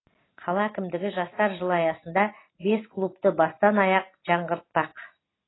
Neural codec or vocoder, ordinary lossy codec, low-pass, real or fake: none; AAC, 16 kbps; 7.2 kHz; real